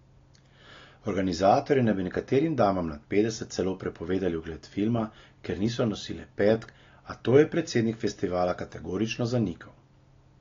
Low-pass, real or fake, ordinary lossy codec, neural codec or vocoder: 7.2 kHz; real; AAC, 32 kbps; none